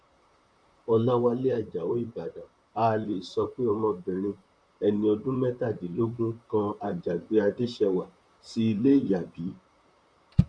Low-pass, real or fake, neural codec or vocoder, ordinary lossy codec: 9.9 kHz; fake; vocoder, 44.1 kHz, 128 mel bands, Pupu-Vocoder; none